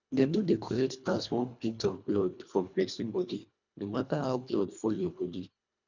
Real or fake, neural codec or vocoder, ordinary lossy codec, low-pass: fake; codec, 24 kHz, 1.5 kbps, HILCodec; none; 7.2 kHz